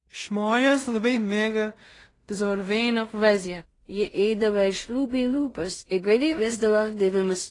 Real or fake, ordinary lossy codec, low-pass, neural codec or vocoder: fake; AAC, 32 kbps; 10.8 kHz; codec, 16 kHz in and 24 kHz out, 0.4 kbps, LongCat-Audio-Codec, two codebook decoder